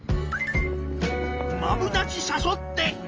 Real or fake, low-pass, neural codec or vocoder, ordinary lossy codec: real; 7.2 kHz; none; Opus, 24 kbps